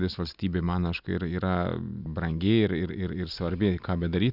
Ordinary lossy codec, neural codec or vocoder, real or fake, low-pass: Opus, 64 kbps; none; real; 5.4 kHz